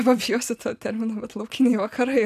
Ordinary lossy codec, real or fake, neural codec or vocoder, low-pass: MP3, 96 kbps; real; none; 14.4 kHz